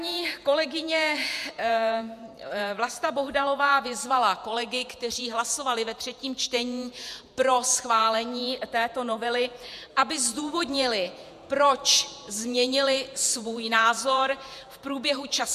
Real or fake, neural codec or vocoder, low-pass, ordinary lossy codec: fake; vocoder, 48 kHz, 128 mel bands, Vocos; 14.4 kHz; MP3, 96 kbps